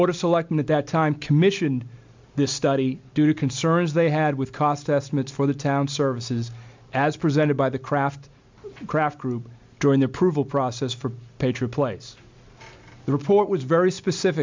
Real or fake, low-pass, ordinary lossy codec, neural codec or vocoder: real; 7.2 kHz; MP3, 64 kbps; none